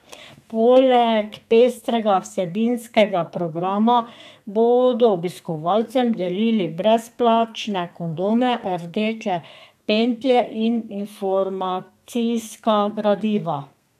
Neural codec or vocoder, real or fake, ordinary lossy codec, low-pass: codec, 32 kHz, 1.9 kbps, SNAC; fake; none; 14.4 kHz